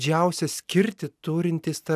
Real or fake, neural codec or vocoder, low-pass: real; none; 14.4 kHz